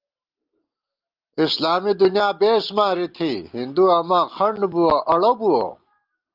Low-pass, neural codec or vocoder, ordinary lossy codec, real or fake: 5.4 kHz; none; Opus, 32 kbps; real